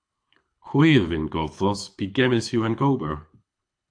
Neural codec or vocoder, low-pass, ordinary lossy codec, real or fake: codec, 24 kHz, 6 kbps, HILCodec; 9.9 kHz; AAC, 64 kbps; fake